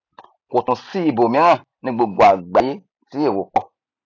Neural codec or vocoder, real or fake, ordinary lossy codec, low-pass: none; real; none; 7.2 kHz